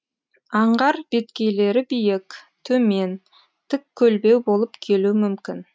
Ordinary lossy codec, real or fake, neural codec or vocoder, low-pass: none; real; none; none